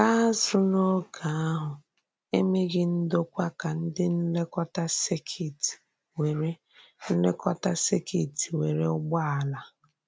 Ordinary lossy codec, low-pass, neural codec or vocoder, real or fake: none; none; none; real